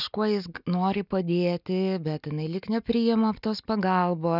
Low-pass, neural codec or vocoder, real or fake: 5.4 kHz; none; real